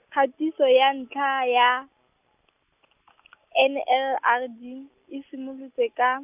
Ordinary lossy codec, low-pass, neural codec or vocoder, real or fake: none; 3.6 kHz; none; real